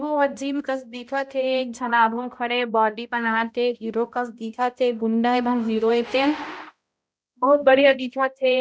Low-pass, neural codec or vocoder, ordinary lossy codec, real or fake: none; codec, 16 kHz, 0.5 kbps, X-Codec, HuBERT features, trained on balanced general audio; none; fake